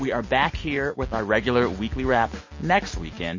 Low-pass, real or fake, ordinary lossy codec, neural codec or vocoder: 7.2 kHz; real; MP3, 32 kbps; none